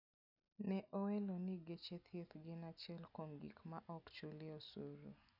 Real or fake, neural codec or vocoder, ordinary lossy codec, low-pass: real; none; none; 5.4 kHz